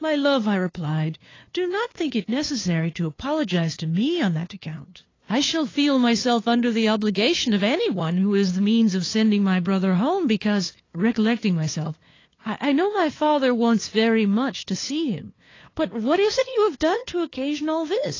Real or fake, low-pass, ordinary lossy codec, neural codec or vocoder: fake; 7.2 kHz; AAC, 32 kbps; codec, 16 kHz, 2 kbps, FunCodec, trained on LibriTTS, 25 frames a second